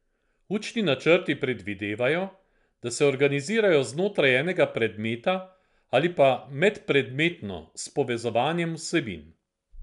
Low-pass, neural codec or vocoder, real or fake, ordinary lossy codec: 10.8 kHz; none; real; MP3, 96 kbps